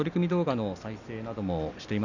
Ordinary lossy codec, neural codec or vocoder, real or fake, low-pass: none; none; real; 7.2 kHz